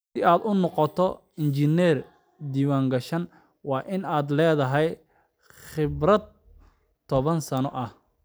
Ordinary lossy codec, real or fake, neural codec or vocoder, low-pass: none; real; none; none